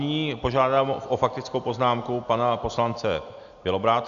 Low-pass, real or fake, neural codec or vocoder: 7.2 kHz; real; none